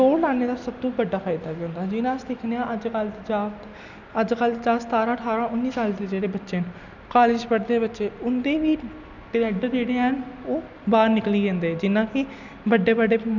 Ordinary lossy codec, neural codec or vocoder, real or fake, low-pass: none; none; real; 7.2 kHz